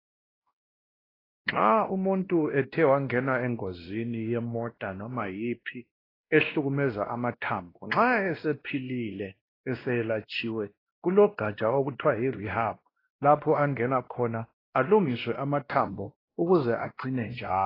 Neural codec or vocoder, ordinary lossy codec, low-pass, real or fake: codec, 16 kHz, 1 kbps, X-Codec, WavLM features, trained on Multilingual LibriSpeech; AAC, 24 kbps; 5.4 kHz; fake